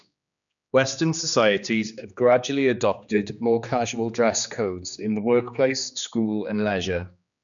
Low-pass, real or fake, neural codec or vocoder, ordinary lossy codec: 7.2 kHz; fake; codec, 16 kHz, 2 kbps, X-Codec, HuBERT features, trained on general audio; none